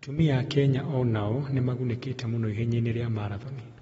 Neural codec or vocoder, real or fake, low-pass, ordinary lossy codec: none; real; 10.8 kHz; AAC, 24 kbps